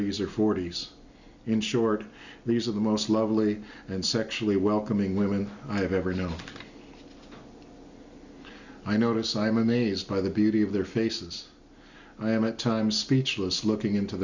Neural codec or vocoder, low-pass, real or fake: none; 7.2 kHz; real